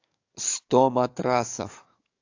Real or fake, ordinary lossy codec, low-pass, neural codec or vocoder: fake; AAC, 48 kbps; 7.2 kHz; codec, 44.1 kHz, 7.8 kbps, DAC